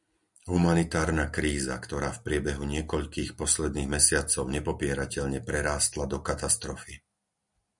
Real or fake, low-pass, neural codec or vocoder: real; 10.8 kHz; none